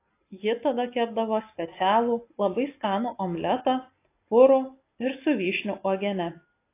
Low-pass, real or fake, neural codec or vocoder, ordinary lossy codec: 3.6 kHz; real; none; AAC, 24 kbps